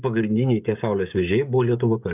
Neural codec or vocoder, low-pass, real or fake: codec, 16 kHz, 16 kbps, FreqCodec, smaller model; 3.6 kHz; fake